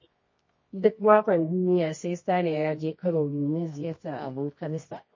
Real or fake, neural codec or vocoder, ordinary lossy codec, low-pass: fake; codec, 24 kHz, 0.9 kbps, WavTokenizer, medium music audio release; MP3, 32 kbps; 7.2 kHz